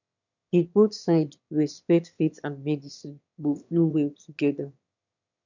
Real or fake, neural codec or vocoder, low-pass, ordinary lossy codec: fake; autoencoder, 22.05 kHz, a latent of 192 numbers a frame, VITS, trained on one speaker; 7.2 kHz; none